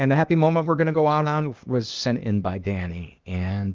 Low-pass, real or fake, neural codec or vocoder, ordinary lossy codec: 7.2 kHz; fake; codec, 16 kHz, 0.8 kbps, ZipCodec; Opus, 32 kbps